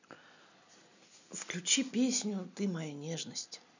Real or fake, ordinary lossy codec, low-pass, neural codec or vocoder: real; none; 7.2 kHz; none